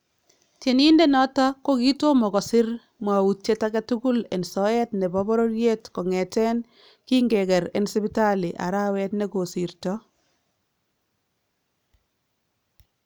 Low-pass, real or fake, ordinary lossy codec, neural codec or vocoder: none; real; none; none